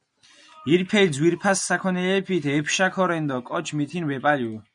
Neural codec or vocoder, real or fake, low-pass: none; real; 9.9 kHz